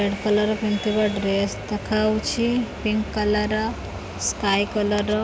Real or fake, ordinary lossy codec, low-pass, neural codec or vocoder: real; none; none; none